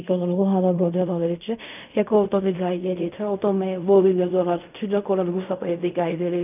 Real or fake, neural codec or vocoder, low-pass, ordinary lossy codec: fake; codec, 16 kHz in and 24 kHz out, 0.4 kbps, LongCat-Audio-Codec, fine tuned four codebook decoder; 3.6 kHz; none